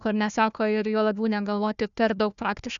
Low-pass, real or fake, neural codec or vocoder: 7.2 kHz; fake; codec, 16 kHz, 1 kbps, FunCodec, trained on Chinese and English, 50 frames a second